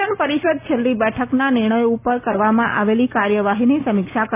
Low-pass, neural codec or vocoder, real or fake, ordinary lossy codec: 3.6 kHz; none; real; none